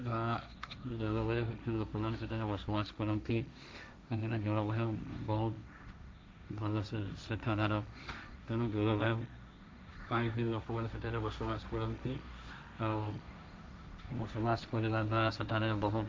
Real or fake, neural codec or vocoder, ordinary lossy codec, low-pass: fake; codec, 16 kHz, 1.1 kbps, Voila-Tokenizer; none; none